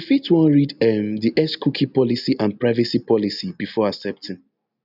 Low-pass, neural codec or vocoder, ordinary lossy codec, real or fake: 5.4 kHz; none; none; real